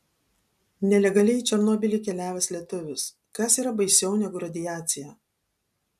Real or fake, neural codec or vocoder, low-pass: real; none; 14.4 kHz